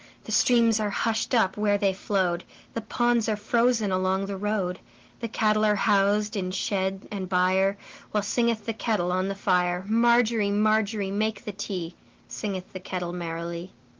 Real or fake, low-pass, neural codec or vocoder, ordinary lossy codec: real; 7.2 kHz; none; Opus, 16 kbps